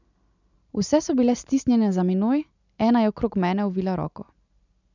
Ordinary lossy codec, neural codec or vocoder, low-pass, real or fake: none; none; 7.2 kHz; real